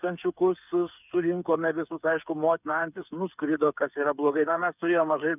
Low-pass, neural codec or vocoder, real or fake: 3.6 kHz; codec, 16 kHz, 8 kbps, FreqCodec, smaller model; fake